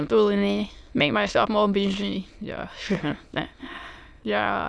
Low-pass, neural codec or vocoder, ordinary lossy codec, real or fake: none; autoencoder, 22.05 kHz, a latent of 192 numbers a frame, VITS, trained on many speakers; none; fake